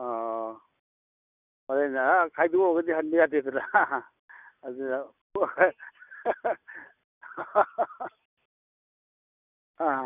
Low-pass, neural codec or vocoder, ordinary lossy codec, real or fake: 3.6 kHz; none; none; real